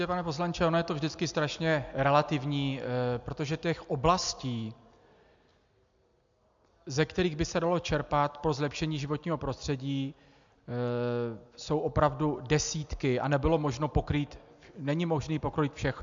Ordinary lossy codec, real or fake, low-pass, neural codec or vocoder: MP3, 64 kbps; real; 7.2 kHz; none